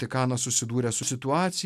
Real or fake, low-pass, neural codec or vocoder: real; 14.4 kHz; none